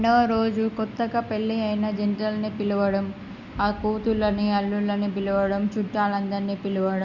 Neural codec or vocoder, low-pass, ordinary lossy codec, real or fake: none; 7.2 kHz; Opus, 64 kbps; real